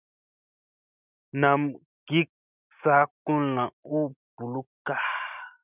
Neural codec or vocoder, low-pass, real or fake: none; 3.6 kHz; real